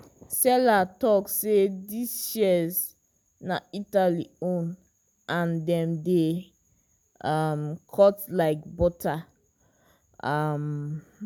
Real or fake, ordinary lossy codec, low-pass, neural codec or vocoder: real; none; none; none